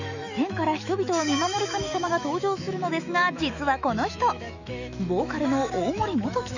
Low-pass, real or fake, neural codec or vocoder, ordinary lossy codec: 7.2 kHz; fake; autoencoder, 48 kHz, 128 numbers a frame, DAC-VAE, trained on Japanese speech; none